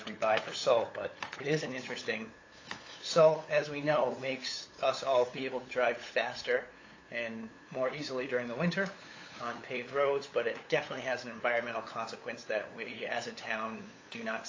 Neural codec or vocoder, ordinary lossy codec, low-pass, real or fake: codec, 16 kHz, 8 kbps, FunCodec, trained on LibriTTS, 25 frames a second; AAC, 32 kbps; 7.2 kHz; fake